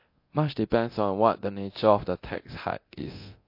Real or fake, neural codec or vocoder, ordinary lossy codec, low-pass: fake; codec, 24 kHz, 0.9 kbps, DualCodec; MP3, 32 kbps; 5.4 kHz